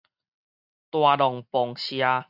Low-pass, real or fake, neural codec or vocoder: 5.4 kHz; real; none